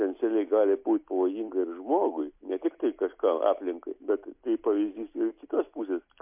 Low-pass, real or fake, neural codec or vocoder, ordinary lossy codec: 3.6 kHz; real; none; MP3, 32 kbps